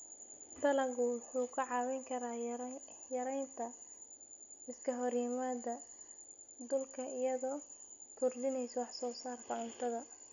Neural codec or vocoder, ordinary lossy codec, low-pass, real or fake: none; none; 7.2 kHz; real